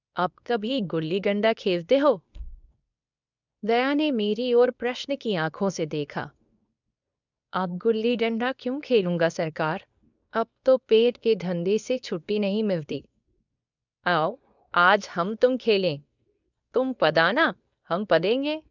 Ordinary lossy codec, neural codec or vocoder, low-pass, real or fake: none; codec, 24 kHz, 0.9 kbps, WavTokenizer, medium speech release version 2; 7.2 kHz; fake